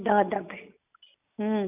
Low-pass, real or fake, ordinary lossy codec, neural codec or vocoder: 3.6 kHz; real; none; none